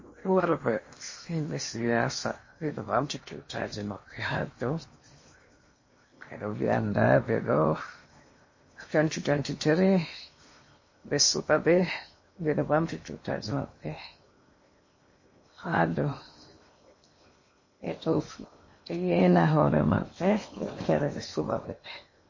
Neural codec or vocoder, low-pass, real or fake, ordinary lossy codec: codec, 16 kHz in and 24 kHz out, 0.8 kbps, FocalCodec, streaming, 65536 codes; 7.2 kHz; fake; MP3, 32 kbps